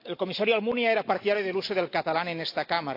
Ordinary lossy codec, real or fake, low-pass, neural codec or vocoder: AAC, 32 kbps; real; 5.4 kHz; none